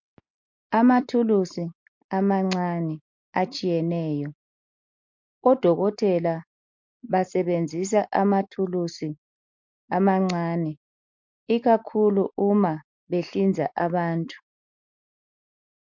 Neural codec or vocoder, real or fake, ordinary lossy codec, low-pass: none; real; MP3, 48 kbps; 7.2 kHz